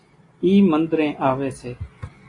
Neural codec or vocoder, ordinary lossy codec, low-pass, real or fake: none; AAC, 32 kbps; 10.8 kHz; real